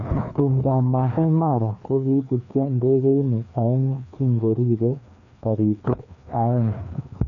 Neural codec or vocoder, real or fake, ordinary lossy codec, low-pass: codec, 16 kHz, 2 kbps, FreqCodec, larger model; fake; none; 7.2 kHz